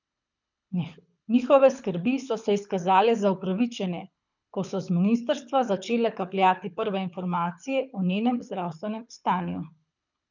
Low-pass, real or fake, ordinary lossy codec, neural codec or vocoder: 7.2 kHz; fake; none; codec, 24 kHz, 6 kbps, HILCodec